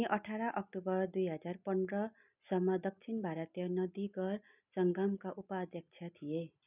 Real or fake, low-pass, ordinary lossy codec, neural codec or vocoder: real; 3.6 kHz; none; none